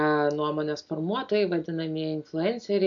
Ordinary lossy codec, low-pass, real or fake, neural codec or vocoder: AAC, 64 kbps; 7.2 kHz; real; none